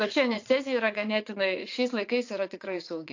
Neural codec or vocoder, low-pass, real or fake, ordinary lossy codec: vocoder, 44.1 kHz, 80 mel bands, Vocos; 7.2 kHz; fake; AAC, 48 kbps